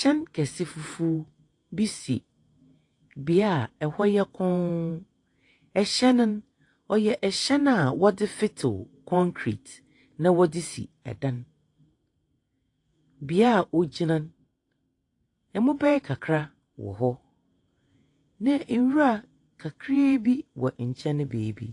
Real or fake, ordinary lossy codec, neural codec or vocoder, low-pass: fake; AAC, 48 kbps; vocoder, 48 kHz, 128 mel bands, Vocos; 10.8 kHz